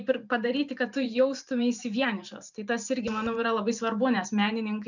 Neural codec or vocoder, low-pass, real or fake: vocoder, 44.1 kHz, 128 mel bands every 512 samples, BigVGAN v2; 7.2 kHz; fake